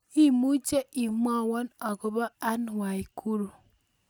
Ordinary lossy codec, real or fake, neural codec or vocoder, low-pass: none; real; none; none